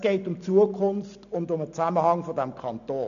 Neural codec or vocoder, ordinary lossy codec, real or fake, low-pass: none; MP3, 96 kbps; real; 7.2 kHz